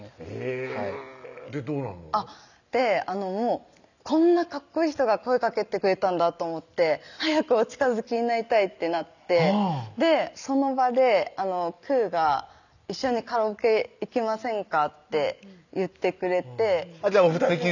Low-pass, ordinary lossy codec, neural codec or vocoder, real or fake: 7.2 kHz; none; none; real